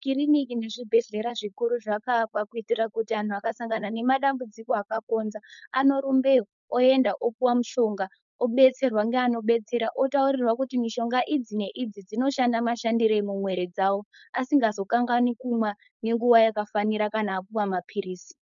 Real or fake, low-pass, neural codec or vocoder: fake; 7.2 kHz; codec, 16 kHz, 4.8 kbps, FACodec